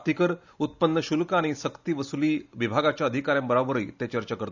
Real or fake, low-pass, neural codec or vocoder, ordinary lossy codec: real; 7.2 kHz; none; none